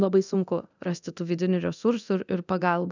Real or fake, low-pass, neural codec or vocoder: fake; 7.2 kHz; codec, 24 kHz, 0.9 kbps, DualCodec